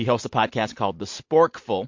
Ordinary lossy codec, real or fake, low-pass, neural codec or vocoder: MP3, 48 kbps; real; 7.2 kHz; none